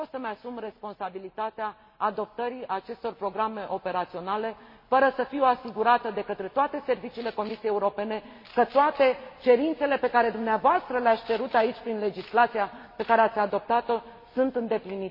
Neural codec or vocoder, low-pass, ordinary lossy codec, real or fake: none; 5.4 kHz; MP3, 24 kbps; real